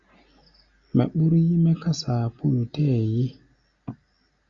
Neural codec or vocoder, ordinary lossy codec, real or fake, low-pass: none; Opus, 64 kbps; real; 7.2 kHz